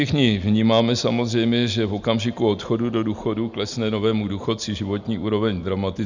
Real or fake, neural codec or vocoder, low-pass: real; none; 7.2 kHz